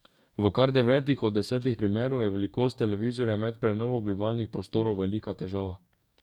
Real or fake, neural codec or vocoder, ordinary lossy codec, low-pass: fake; codec, 44.1 kHz, 2.6 kbps, DAC; none; 19.8 kHz